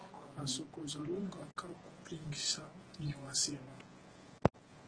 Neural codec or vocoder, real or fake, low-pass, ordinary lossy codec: vocoder, 48 kHz, 128 mel bands, Vocos; fake; 9.9 kHz; Opus, 16 kbps